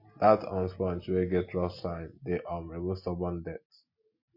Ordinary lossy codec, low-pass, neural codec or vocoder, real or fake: MP3, 32 kbps; 5.4 kHz; none; real